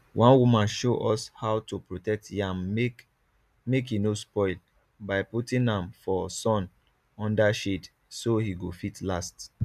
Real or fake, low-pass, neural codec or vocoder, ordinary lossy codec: real; 14.4 kHz; none; none